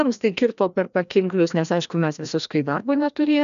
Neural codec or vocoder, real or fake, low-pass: codec, 16 kHz, 1 kbps, FreqCodec, larger model; fake; 7.2 kHz